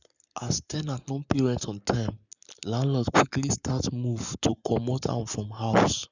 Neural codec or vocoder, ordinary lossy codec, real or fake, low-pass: codec, 44.1 kHz, 7.8 kbps, Pupu-Codec; none; fake; 7.2 kHz